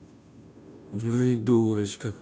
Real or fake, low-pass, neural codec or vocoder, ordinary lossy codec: fake; none; codec, 16 kHz, 0.5 kbps, FunCodec, trained on Chinese and English, 25 frames a second; none